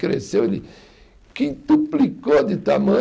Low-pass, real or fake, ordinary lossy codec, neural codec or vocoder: none; real; none; none